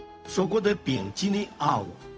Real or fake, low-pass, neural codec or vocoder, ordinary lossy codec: fake; none; codec, 16 kHz, 0.4 kbps, LongCat-Audio-Codec; none